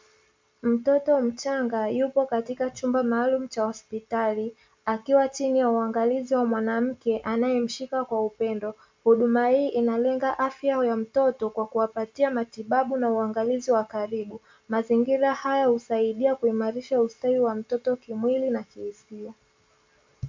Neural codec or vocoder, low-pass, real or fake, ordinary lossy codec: none; 7.2 kHz; real; MP3, 64 kbps